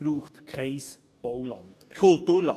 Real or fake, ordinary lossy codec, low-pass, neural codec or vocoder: fake; none; 14.4 kHz; codec, 44.1 kHz, 2.6 kbps, DAC